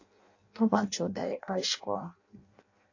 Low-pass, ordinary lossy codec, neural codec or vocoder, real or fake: 7.2 kHz; AAC, 48 kbps; codec, 16 kHz in and 24 kHz out, 0.6 kbps, FireRedTTS-2 codec; fake